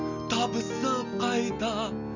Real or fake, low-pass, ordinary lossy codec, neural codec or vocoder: real; 7.2 kHz; none; none